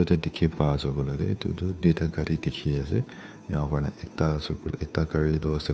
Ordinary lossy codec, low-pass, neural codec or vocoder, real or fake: none; none; codec, 16 kHz, 2 kbps, FunCodec, trained on Chinese and English, 25 frames a second; fake